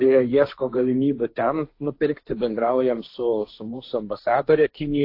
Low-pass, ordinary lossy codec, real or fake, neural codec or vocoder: 5.4 kHz; AAC, 32 kbps; fake; codec, 16 kHz, 1.1 kbps, Voila-Tokenizer